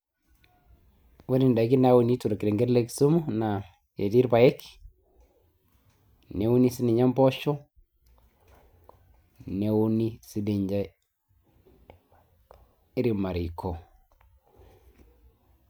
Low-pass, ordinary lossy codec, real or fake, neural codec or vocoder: none; none; real; none